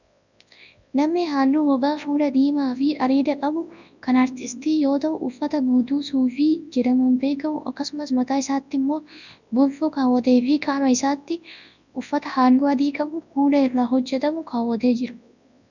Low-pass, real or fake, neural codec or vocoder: 7.2 kHz; fake; codec, 24 kHz, 0.9 kbps, WavTokenizer, large speech release